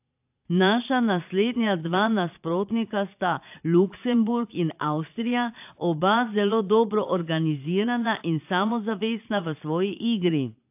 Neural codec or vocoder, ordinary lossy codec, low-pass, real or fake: vocoder, 22.05 kHz, 80 mel bands, Vocos; AAC, 32 kbps; 3.6 kHz; fake